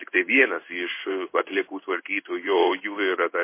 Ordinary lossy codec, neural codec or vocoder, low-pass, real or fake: MP3, 24 kbps; codec, 16 kHz in and 24 kHz out, 1 kbps, XY-Tokenizer; 3.6 kHz; fake